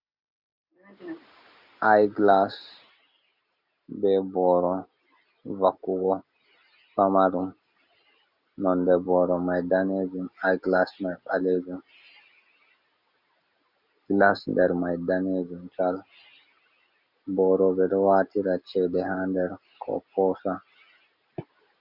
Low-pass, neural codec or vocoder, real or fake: 5.4 kHz; none; real